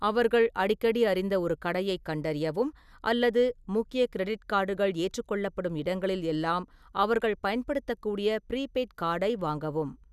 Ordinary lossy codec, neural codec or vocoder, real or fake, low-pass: none; none; real; 14.4 kHz